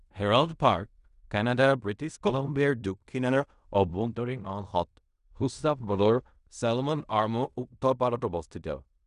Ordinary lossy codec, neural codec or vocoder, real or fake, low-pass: none; codec, 16 kHz in and 24 kHz out, 0.4 kbps, LongCat-Audio-Codec, fine tuned four codebook decoder; fake; 10.8 kHz